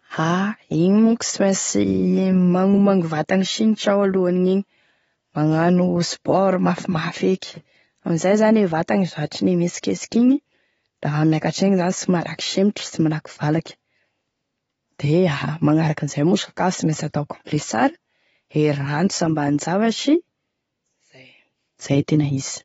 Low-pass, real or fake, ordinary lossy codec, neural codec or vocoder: 19.8 kHz; real; AAC, 24 kbps; none